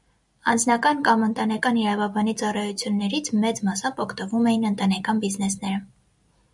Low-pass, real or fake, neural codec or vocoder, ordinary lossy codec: 10.8 kHz; real; none; MP3, 96 kbps